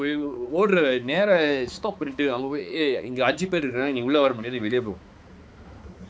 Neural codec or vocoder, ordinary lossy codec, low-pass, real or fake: codec, 16 kHz, 4 kbps, X-Codec, HuBERT features, trained on balanced general audio; none; none; fake